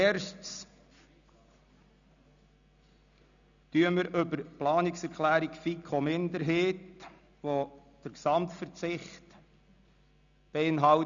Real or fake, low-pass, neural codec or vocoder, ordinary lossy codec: real; 7.2 kHz; none; none